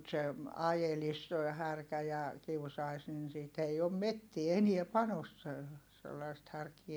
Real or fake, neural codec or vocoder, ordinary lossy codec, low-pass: real; none; none; none